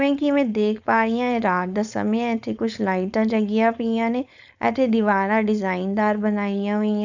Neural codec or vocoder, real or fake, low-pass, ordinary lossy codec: codec, 16 kHz, 4.8 kbps, FACodec; fake; 7.2 kHz; none